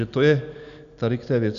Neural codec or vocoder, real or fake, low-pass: none; real; 7.2 kHz